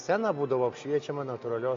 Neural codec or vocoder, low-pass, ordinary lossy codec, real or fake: none; 7.2 kHz; MP3, 48 kbps; real